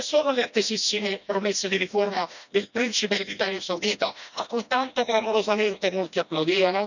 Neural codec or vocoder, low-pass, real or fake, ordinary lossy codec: codec, 16 kHz, 1 kbps, FreqCodec, smaller model; 7.2 kHz; fake; none